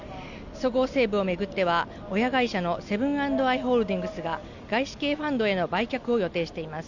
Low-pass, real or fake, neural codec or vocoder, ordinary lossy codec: 7.2 kHz; real; none; none